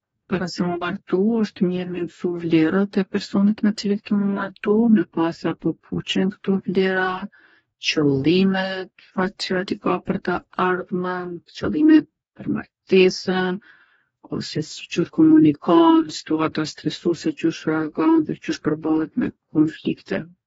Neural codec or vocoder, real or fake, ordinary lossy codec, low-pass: codec, 44.1 kHz, 2.6 kbps, DAC; fake; AAC, 24 kbps; 19.8 kHz